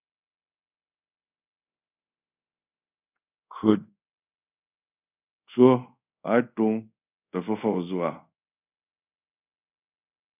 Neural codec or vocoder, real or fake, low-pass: codec, 24 kHz, 0.5 kbps, DualCodec; fake; 3.6 kHz